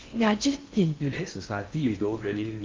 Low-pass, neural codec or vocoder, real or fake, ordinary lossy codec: 7.2 kHz; codec, 16 kHz in and 24 kHz out, 0.6 kbps, FocalCodec, streaming, 4096 codes; fake; Opus, 32 kbps